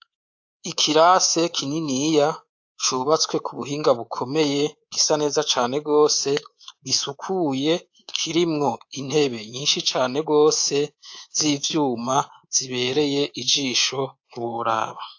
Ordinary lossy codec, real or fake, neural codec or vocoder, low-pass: AAC, 48 kbps; fake; codec, 24 kHz, 3.1 kbps, DualCodec; 7.2 kHz